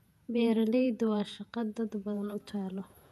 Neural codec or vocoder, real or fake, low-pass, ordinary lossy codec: vocoder, 48 kHz, 128 mel bands, Vocos; fake; 14.4 kHz; none